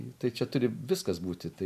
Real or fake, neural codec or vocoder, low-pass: real; none; 14.4 kHz